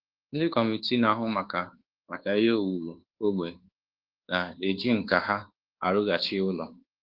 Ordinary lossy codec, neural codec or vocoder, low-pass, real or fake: Opus, 16 kbps; codec, 24 kHz, 1.2 kbps, DualCodec; 5.4 kHz; fake